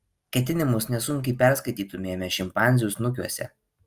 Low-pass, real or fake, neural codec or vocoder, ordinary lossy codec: 14.4 kHz; real; none; Opus, 32 kbps